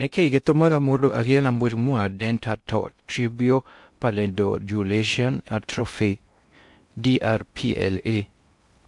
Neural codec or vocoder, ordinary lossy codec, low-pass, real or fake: codec, 16 kHz in and 24 kHz out, 0.6 kbps, FocalCodec, streaming, 2048 codes; MP3, 64 kbps; 10.8 kHz; fake